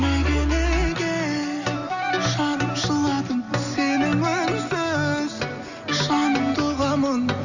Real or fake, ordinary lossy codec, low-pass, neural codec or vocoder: real; none; 7.2 kHz; none